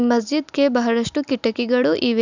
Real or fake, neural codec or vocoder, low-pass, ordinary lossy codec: real; none; 7.2 kHz; none